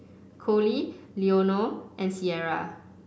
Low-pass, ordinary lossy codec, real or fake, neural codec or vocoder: none; none; real; none